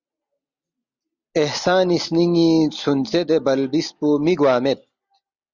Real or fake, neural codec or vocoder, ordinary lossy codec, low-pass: real; none; Opus, 64 kbps; 7.2 kHz